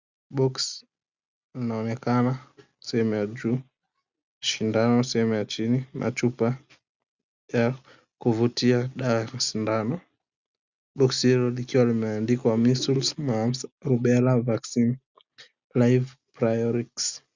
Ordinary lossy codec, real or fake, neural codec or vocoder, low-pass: Opus, 64 kbps; real; none; 7.2 kHz